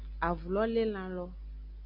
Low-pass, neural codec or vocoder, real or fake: 5.4 kHz; none; real